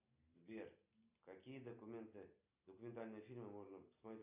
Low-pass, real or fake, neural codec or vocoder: 3.6 kHz; real; none